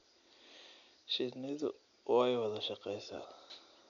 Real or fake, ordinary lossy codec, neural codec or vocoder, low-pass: real; none; none; 7.2 kHz